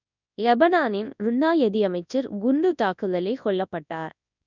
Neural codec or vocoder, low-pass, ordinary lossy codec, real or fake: codec, 24 kHz, 0.9 kbps, WavTokenizer, large speech release; 7.2 kHz; none; fake